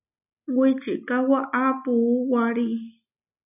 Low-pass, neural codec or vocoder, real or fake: 3.6 kHz; none; real